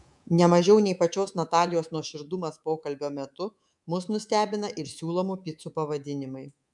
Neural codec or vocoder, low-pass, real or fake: codec, 24 kHz, 3.1 kbps, DualCodec; 10.8 kHz; fake